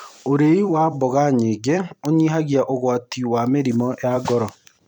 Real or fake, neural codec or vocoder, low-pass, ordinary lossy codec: fake; vocoder, 44.1 kHz, 128 mel bands every 256 samples, BigVGAN v2; 19.8 kHz; none